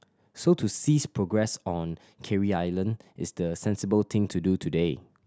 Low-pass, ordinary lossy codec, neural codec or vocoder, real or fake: none; none; none; real